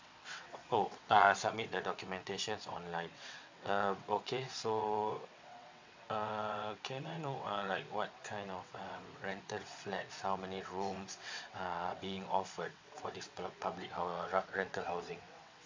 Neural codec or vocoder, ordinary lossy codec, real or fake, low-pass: vocoder, 22.05 kHz, 80 mel bands, WaveNeXt; MP3, 64 kbps; fake; 7.2 kHz